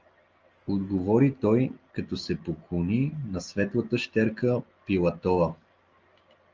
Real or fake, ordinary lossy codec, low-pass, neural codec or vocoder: real; Opus, 24 kbps; 7.2 kHz; none